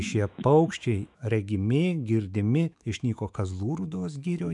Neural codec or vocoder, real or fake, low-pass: autoencoder, 48 kHz, 128 numbers a frame, DAC-VAE, trained on Japanese speech; fake; 10.8 kHz